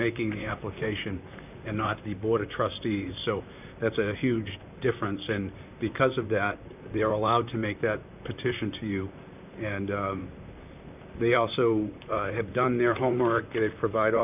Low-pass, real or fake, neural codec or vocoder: 3.6 kHz; fake; vocoder, 44.1 kHz, 128 mel bands, Pupu-Vocoder